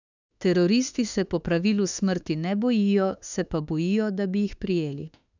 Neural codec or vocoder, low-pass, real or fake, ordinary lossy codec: autoencoder, 48 kHz, 32 numbers a frame, DAC-VAE, trained on Japanese speech; 7.2 kHz; fake; none